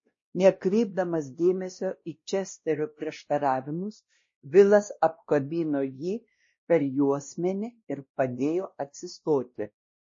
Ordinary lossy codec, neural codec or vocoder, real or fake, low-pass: MP3, 32 kbps; codec, 16 kHz, 1 kbps, X-Codec, WavLM features, trained on Multilingual LibriSpeech; fake; 7.2 kHz